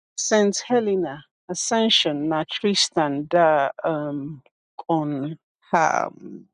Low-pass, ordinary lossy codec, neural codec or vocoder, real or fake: 9.9 kHz; none; none; real